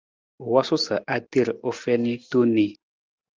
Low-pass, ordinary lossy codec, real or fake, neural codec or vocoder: 7.2 kHz; Opus, 32 kbps; real; none